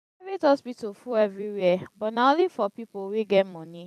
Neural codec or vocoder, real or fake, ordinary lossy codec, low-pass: vocoder, 44.1 kHz, 128 mel bands every 256 samples, BigVGAN v2; fake; none; 14.4 kHz